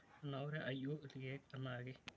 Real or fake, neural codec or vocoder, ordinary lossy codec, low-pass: real; none; none; none